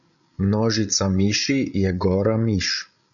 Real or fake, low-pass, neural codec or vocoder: fake; 7.2 kHz; codec, 16 kHz, 8 kbps, FreqCodec, larger model